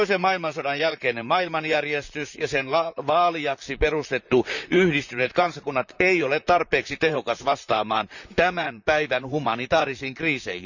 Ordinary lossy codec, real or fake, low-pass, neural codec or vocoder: none; fake; 7.2 kHz; vocoder, 44.1 kHz, 128 mel bands, Pupu-Vocoder